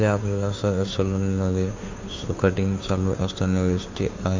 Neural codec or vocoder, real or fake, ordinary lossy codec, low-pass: codec, 16 kHz, 2 kbps, FunCodec, trained on Chinese and English, 25 frames a second; fake; MP3, 48 kbps; 7.2 kHz